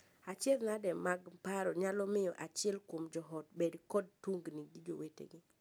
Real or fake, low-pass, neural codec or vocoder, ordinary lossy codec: real; none; none; none